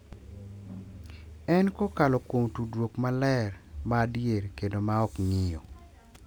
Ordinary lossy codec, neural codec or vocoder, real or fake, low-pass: none; none; real; none